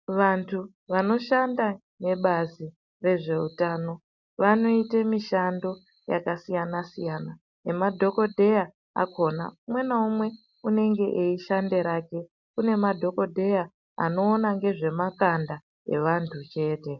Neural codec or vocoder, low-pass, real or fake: none; 7.2 kHz; real